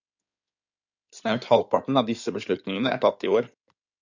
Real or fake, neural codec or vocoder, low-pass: fake; codec, 16 kHz in and 24 kHz out, 2.2 kbps, FireRedTTS-2 codec; 7.2 kHz